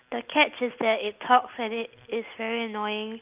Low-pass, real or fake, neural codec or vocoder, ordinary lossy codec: 3.6 kHz; real; none; Opus, 24 kbps